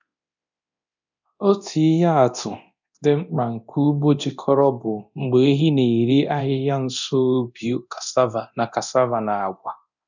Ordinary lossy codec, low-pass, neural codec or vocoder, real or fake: none; 7.2 kHz; codec, 24 kHz, 0.9 kbps, DualCodec; fake